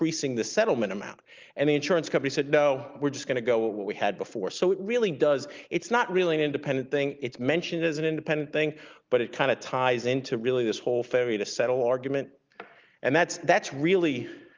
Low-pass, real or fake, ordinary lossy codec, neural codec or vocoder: 7.2 kHz; real; Opus, 32 kbps; none